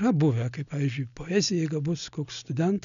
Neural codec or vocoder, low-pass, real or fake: none; 7.2 kHz; real